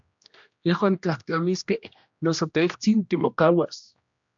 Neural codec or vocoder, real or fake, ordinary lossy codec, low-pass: codec, 16 kHz, 1 kbps, X-Codec, HuBERT features, trained on general audio; fake; AAC, 64 kbps; 7.2 kHz